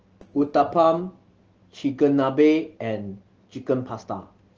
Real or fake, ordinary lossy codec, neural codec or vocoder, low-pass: real; Opus, 16 kbps; none; 7.2 kHz